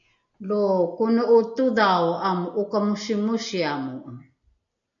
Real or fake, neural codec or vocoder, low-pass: real; none; 7.2 kHz